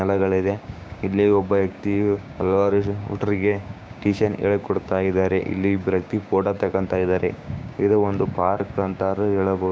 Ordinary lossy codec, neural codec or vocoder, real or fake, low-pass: none; codec, 16 kHz, 6 kbps, DAC; fake; none